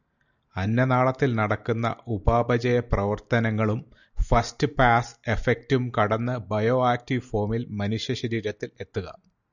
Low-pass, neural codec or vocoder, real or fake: 7.2 kHz; none; real